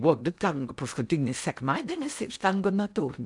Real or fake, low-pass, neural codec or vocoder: fake; 10.8 kHz; codec, 16 kHz in and 24 kHz out, 0.8 kbps, FocalCodec, streaming, 65536 codes